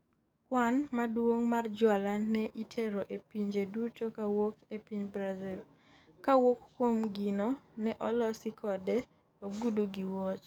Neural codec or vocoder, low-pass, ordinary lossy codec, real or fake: codec, 44.1 kHz, 7.8 kbps, DAC; none; none; fake